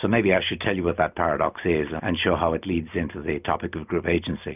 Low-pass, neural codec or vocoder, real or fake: 3.6 kHz; none; real